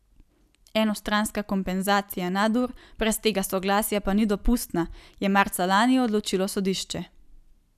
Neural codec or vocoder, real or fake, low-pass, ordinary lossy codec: none; real; 14.4 kHz; none